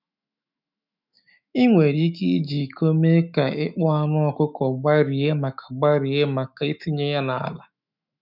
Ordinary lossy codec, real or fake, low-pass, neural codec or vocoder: none; fake; 5.4 kHz; autoencoder, 48 kHz, 128 numbers a frame, DAC-VAE, trained on Japanese speech